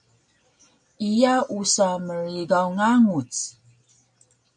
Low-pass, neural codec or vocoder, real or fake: 9.9 kHz; none; real